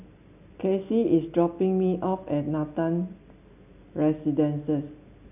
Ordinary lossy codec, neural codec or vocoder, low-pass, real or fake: none; none; 3.6 kHz; real